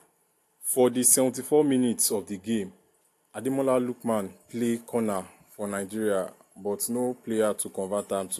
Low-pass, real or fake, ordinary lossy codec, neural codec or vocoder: 14.4 kHz; real; AAC, 64 kbps; none